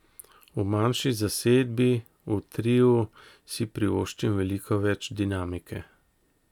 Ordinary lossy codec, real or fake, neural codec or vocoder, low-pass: none; real; none; 19.8 kHz